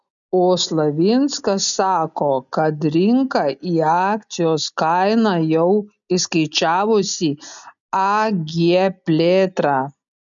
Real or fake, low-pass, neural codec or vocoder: real; 7.2 kHz; none